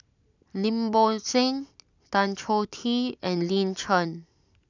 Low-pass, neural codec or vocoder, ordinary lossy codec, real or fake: 7.2 kHz; none; none; real